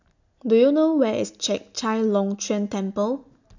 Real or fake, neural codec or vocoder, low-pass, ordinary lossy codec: real; none; 7.2 kHz; none